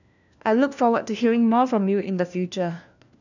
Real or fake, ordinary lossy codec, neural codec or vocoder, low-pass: fake; none; codec, 16 kHz, 1 kbps, FunCodec, trained on LibriTTS, 50 frames a second; 7.2 kHz